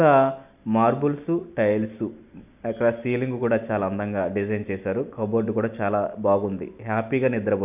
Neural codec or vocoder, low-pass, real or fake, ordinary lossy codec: none; 3.6 kHz; real; none